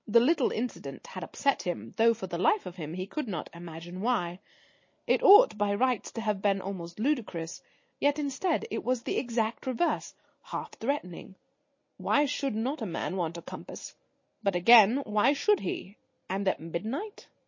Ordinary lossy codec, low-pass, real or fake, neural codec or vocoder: MP3, 32 kbps; 7.2 kHz; real; none